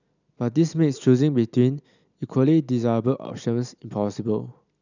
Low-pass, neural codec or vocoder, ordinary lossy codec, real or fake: 7.2 kHz; none; none; real